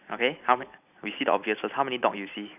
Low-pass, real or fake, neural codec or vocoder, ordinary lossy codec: 3.6 kHz; real; none; none